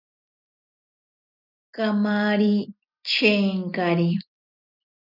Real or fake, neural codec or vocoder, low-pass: real; none; 5.4 kHz